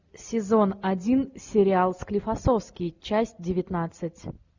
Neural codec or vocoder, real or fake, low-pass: none; real; 7.2 kHz